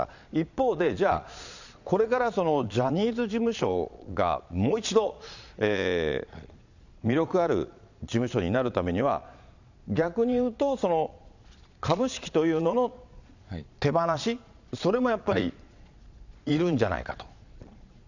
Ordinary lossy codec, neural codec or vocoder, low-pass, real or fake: none; vocoder, 22.05 kHz, 80 mel bands, Vocos; 7.2 kHz; fake